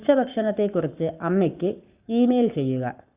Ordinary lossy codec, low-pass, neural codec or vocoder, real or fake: Opus, 64 kbps; 3.6 kHz; codec, 44.1 kHz, 7.8 kbps, Pupu-Codec; fake